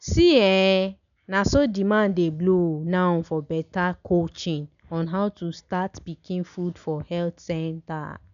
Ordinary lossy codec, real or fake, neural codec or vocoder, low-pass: none; real; none; 7.2 kHz